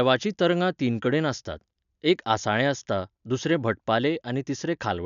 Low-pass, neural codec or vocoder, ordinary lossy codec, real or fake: 7.2 kHz; none; none; real